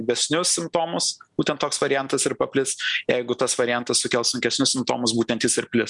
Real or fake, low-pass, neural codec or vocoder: real; 10.8 kHz; none